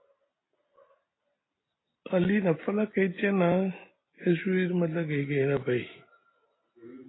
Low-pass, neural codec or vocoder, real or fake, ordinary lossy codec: 7.2 kHz; none; real; AAC, 16 kbps